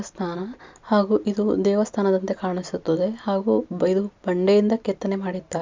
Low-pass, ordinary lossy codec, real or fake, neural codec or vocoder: 7.2 kHz; MP3, 64 kbps; real; none